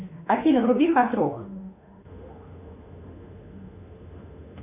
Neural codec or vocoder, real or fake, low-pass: autoencoder, 48 kHz, 32 numbers a frame, DAC-VAE, trained on Japanese speech; fake; 3.6 kHz